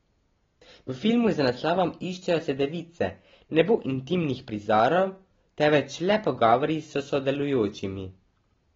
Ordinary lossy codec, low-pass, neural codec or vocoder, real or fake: AAC, 24 kbps; 7.2 kHz; none; real